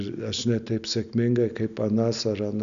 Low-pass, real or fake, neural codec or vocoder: 7.2 kHz; real; none